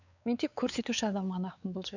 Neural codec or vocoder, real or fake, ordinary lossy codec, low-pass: codec, 16 kHz, 4 kbps, X-Codec, WavLM features, trained on Multilingual LibriSpeech; fake; none; 7.2 kHz